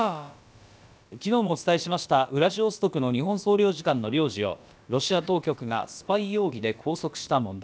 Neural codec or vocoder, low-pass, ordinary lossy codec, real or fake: codec, 16 kHz, about 1 kbps, DyCAST, with the encoder's durations; none; none; fake